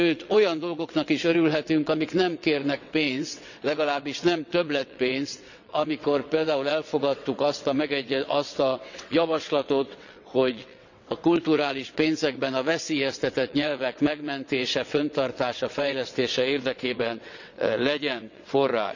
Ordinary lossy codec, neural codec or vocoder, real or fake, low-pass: none; vocoder, 22.05 kHz, 80 mel bands, WaveNeXt; fake; 7.2 kHz